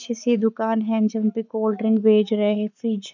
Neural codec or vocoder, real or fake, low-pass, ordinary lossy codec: codec, 44.1 kHz, 7.8 kbps, Pupu-Codec; fake; 7.2 kHz; none